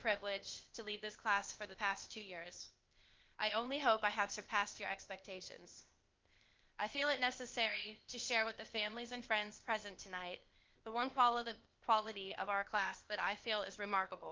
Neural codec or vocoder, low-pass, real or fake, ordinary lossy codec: codec, 16 kHz, 0.8 kbps, ZipCodec; 7.2 kHz; fake; Opus, 24 kbps